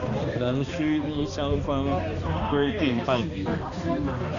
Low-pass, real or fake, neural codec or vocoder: 7.2 kHz; fake; codec, 16 kHz, 2 kbps, X-Codec, HuBERT features, trained on balanced general audio